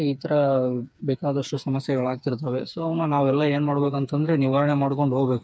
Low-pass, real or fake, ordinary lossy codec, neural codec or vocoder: none; fake; none; codec, 16 kHz, 4 kbps, FreqCodec, smaller model